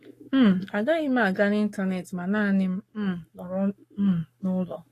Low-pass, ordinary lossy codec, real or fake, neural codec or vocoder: 14.4 kHz; AAC, 48 kbps; fake; codec, 44.1 kHz, 7.8 kbps, Pupu-Codec